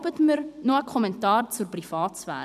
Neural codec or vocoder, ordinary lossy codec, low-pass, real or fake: vocoder, 44.1 kHz, 128 mel bands every 256 samples, BigVGAN v2; none; 14.4 kHz; fake